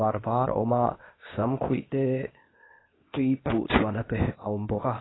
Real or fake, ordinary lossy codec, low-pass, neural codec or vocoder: fake; AAC, 16 kbps; 7.2 kHz; codec, 16 kHz, 0.8 kbps, ZipCodec